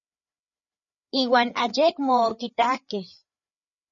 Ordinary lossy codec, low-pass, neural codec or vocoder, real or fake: MP3, 32 kbps; 7.2 kHz; codec, 16 kHz, 4 kbps, FreqCodec, larger model; fake